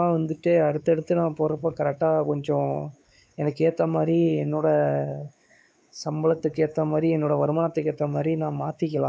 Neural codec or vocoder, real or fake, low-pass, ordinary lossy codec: codec, 16 kHz, 4 kbps, X-Codec, WavLM features, trained on Multilingual LibriSpeech; fake; none; none